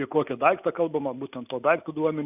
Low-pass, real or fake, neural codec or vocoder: 3.6 kHz; real; none